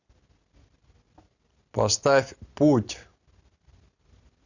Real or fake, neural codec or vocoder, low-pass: real; none; 7.2 kHz